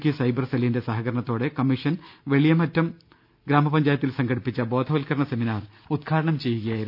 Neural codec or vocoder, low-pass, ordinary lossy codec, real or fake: none; 5.4 kHz; none; real